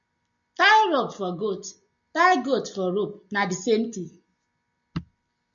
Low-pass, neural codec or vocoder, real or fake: 7.2 kHz; none; real